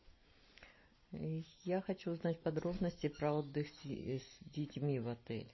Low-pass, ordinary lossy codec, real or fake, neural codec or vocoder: 7.2 kHz; MP3, 24 kbps; real; none